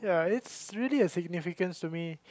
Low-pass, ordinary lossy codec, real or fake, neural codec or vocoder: none; none; real; none